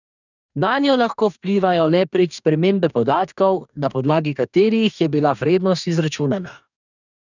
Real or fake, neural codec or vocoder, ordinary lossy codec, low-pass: fake; codec, 44.1 kHz, 2.6 kbps, DAC; none; 7.2 kHz